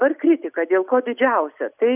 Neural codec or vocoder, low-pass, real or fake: none; 3.6 kHz; real